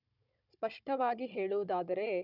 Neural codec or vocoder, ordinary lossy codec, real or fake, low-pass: codec, 16 kHz, 16 kbps, FunCodec, trained on Chinese and English, 50 frames a second; none; fake; 5.4 kHz